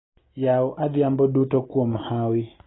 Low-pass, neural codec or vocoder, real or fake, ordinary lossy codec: 7.2 kHz; none; real; AAC, 16 kbps